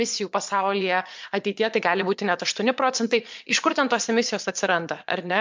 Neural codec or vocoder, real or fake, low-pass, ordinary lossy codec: vocoder, 22.05 kHz, 80 mel bands, Vocos; fake; 7.2 kHz; MP3, 64 kbps